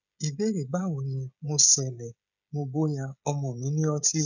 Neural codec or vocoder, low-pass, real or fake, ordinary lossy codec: codec, 16 kHz, 16 kbps, FreqCodec, smaller model; 7.2 kHz; fake; none